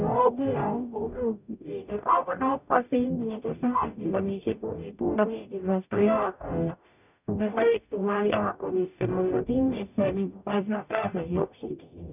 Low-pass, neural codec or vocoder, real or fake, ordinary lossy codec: 3.6 kHz; codec, 44.1 kHz, 0.9 kbps, DAC; fake; none